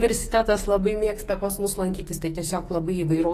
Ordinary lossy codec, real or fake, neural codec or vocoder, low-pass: AAC, 48 kbps; fake; codec, 44.1 kHz, 2.6 kbps, SNAC; 14.4 kHz